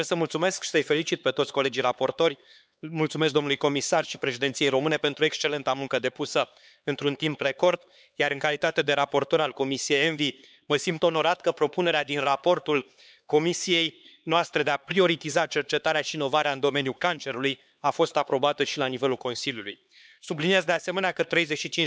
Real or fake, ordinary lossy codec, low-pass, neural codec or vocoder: fake; none; none; codec, 16 kHz, 4 kbps, X-Codec, HuBERT features, trained on LibriSpeech